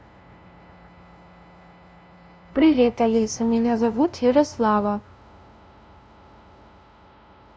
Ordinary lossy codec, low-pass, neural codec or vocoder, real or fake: none; none; codec, 16 kHz, 0.5 kbps, FunCodec, trained on LibriTTS, 25 frames a second; fake